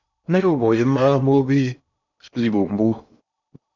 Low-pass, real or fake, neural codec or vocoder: 7.2 kHz; fake; codec, 16 kHz in and 24 kHz out, 0.8 kbps, FocalCodec, streaming, 65536 codes